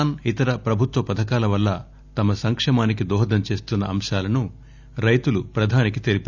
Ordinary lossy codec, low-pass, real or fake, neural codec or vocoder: none; 7.2 kHz; real; none